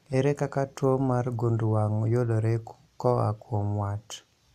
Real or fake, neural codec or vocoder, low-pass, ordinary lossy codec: real; none; 14.4 kHz; none